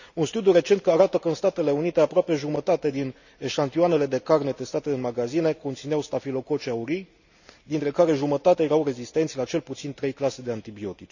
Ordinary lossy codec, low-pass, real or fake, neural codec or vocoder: none; 7.2 kHz; real; none